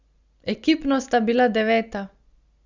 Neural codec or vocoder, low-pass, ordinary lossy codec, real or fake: none; 7.2 kHz; Opus, 64 kbps; real